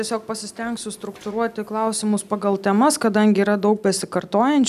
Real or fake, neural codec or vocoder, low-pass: real; none; 14.4 kHz